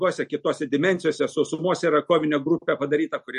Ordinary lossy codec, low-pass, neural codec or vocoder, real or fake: MP3, 48 kbps; 14.4 kHz; none; real